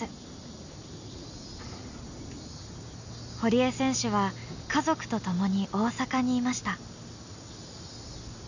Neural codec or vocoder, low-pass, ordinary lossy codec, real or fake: none; 7.2 kHz; none; real